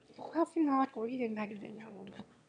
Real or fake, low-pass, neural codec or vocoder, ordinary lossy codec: fake; 9.9 kHz; autoencoder, 22.05 kHz, a latent of 192 numbers a frame, VITS, trained on one speaker; none